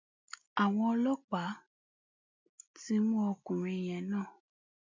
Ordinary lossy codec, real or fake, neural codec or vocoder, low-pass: MP3, 64 kbps; real; none; 7.2 kHz